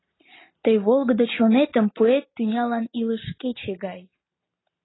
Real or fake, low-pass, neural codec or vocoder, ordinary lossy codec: real; 7.2 kHz; none; AAC, 16 kbps